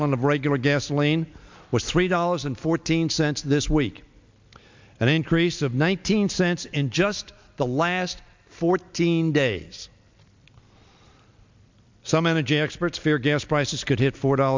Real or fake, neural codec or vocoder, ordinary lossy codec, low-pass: real; none; MP3, 64 kbps; 7.2 kHz